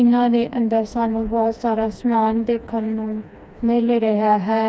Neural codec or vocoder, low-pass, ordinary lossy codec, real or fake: codec, 16 kHz, 2 kbps, FreqCodec, smaller model; none; none; fake